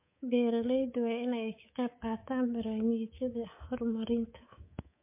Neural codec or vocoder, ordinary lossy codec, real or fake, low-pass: codec, 24 kHz, 3.1 kbps, DualCodec; MP3, 24 kbps; fake; 3.6 kHz